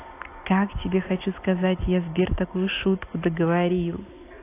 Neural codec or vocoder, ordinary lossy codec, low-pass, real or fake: none; AAC, 24 kbps; 3.6 kHz; real